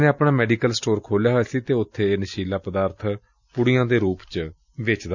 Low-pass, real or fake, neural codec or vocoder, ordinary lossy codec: 7.2 kHz; real; none; none